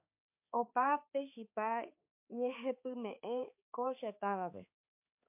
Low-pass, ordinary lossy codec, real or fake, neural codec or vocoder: 3.6 kHz; MP3, 32 kbps; fake; codec, 16 kHz, 4 kbps, X-Codec, HuBERT features, trained on balanced general audio